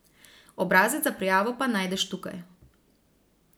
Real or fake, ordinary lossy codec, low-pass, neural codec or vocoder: real; none; none; none